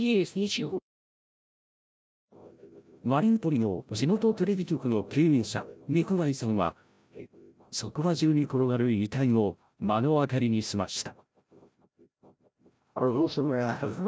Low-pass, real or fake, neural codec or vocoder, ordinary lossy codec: none; fake; codec, 16 kHz, 0.5 kbps, FreqCodec, larger model; none